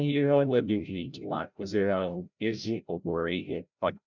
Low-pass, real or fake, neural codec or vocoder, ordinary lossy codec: 7.2 kHz; fake; codec, 16 kHz, 0.5 kbps, FreqCodec, larger model; none